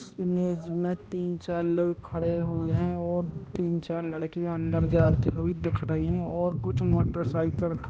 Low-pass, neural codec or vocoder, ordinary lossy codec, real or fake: none; codec, 16 kHz, 1 kbps, X-Codec, HuBERT features, trained on balanced general audio; none; fake